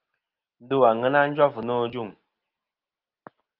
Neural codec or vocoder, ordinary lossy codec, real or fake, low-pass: none; Opus, 24 kbps; real; 5.4 kHz